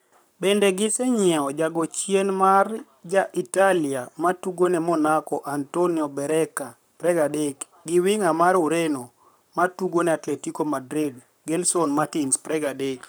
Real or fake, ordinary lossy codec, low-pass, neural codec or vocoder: fake; none; none; codec, 44.1 kHz, 7.8 kbps, Pupu-Codec